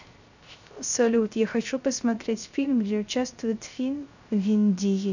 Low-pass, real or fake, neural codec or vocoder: 7.2 kHz; fake; codec, 16 kHz, 0.3 kbps, FocalCodec